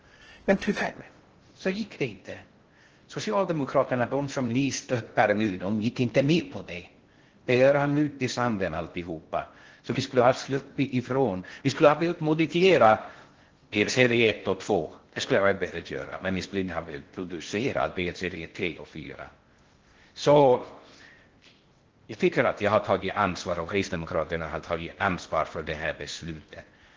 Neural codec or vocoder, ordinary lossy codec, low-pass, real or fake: codec, 16 kHz in and 24 kHz out, 0.6 kbps, FocalCodec, streaming, 2048 codes; Opus, 16 kbps; 7.2 kHz; fake